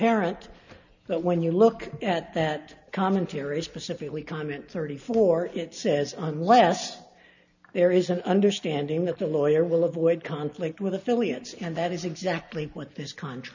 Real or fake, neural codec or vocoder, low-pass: real; none; 7.2 kHz